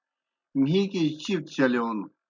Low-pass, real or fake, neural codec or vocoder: 7.2 kHz; real; none